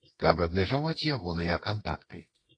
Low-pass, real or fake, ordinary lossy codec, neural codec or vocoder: 9.9 kHz; fake; AAC, 32 kbps; codec, 24 kHz, 0.9 kbps, WavTokenizer, medium music audio release